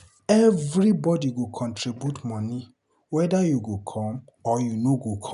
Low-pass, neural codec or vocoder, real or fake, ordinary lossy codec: 10.8 kHz; none; real; none